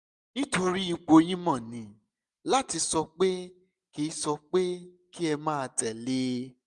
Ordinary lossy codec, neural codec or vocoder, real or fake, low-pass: MP3, 96 kbps; none; real; 10.8 kHz